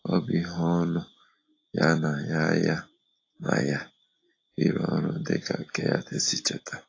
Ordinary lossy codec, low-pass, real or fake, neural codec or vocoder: AAC, 32 kbps; 7.2 kHz; real; none